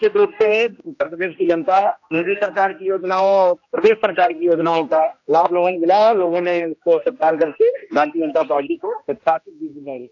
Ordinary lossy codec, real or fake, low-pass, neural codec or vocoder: AAC, 48 kbps; fake; 7.2 kHz; codec, 16 kHz, 1 kbps, X-Codec, HuBERT features, trained on general audio